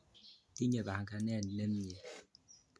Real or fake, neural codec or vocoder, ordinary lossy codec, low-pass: real; none; none; none